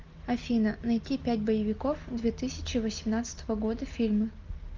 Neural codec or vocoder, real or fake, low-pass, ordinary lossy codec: none; real; 7.2 kHz; Opus, 24 kbps